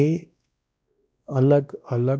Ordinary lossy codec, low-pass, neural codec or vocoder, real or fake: none; none; codec, 16 kHz, 1 kbps, X-Codec, WavLM features, trained on Multilingual LibriSpeech; fake